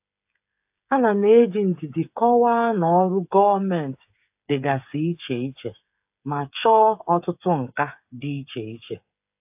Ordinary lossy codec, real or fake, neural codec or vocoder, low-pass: AAC, 32 kbps; fake; codec, 16 kHz, 8 kbps, FreqCodec, smaller model; 3.6 kHz